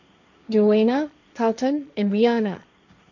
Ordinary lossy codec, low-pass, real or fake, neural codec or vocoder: none; 7.2 kHz; fake; codec, 16 kHz, 1.1 kbps, Voila-Tokenizer